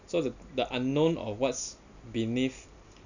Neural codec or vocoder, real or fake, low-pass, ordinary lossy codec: none; real; 7.2 kHz; none